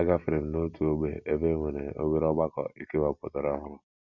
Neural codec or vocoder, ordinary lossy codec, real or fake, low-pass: none; Opus, 64 kbps; real; 7.2 kHz